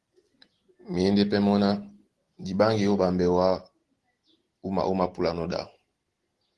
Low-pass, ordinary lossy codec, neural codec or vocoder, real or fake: 10.8 kHz; Opus, 16 kbps; none; real